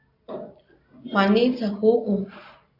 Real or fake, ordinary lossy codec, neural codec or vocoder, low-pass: real; AAC, 32 kbps; none; 5.4 kHz